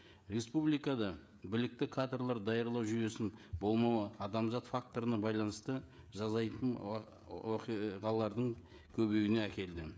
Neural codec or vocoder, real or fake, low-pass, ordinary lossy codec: codec, 16 kHz, 16 kbps, FreqCodec, smaller model; fake; none; none